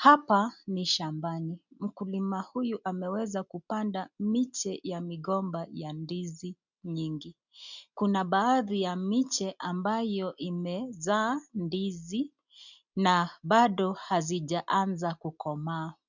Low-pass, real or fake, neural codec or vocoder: 7.2 kHz; real; none